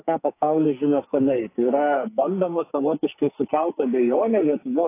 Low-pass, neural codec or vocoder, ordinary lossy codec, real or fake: 3.6 kHz; codec, 44.1 kHz, 2.6 kbps, SNAC; AAC, 24 kbps; fake